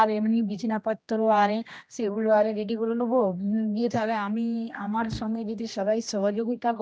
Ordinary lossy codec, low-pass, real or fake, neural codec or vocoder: none; none; fake; codec, 16 kHz, 1 kbps, X-Codec, HuBERT features, trained on general audio